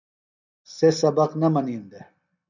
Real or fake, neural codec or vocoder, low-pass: real; none; 7.2 kHz